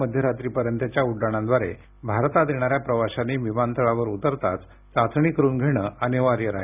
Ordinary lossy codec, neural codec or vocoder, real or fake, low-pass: none; none; real; 3.6 kHz